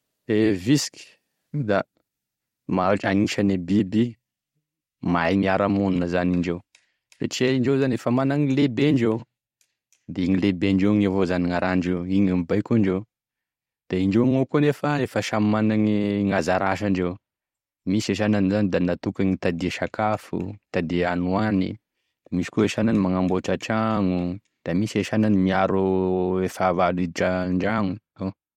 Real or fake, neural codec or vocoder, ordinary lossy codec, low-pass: fake; vocoder, 44.1 kHz, 128 mel bands every 256 samples, BigVGAN v2; MP3, 64 kbps; 19.8 kHz